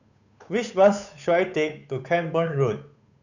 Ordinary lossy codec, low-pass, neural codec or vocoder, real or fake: none; 7.2 kHz; codec, 16 kHz, 8 kbps, FunCodec, trained on Chinese and English, 25 frames a second; fake